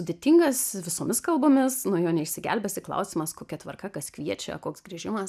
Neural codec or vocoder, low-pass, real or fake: none; 14.4 kHz; real